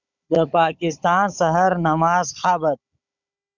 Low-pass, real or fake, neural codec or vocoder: 7.2 kHz; fake; codec, 16 kHz, 16 kbps, FunCodec, trained on Chinese and English, 50 frames a second